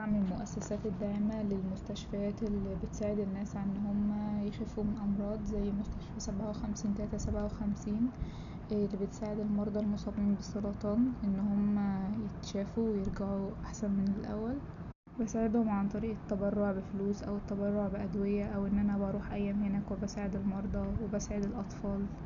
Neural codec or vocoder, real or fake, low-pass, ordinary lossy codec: none; real; 7.2 kHz; none